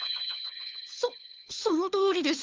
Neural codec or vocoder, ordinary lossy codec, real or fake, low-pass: codec, 16 kHz, 4 kbps, FunCodec, trained on LibriTTS, 50 frames a second; Opus, 32 kbps; fake; 7.2 kHz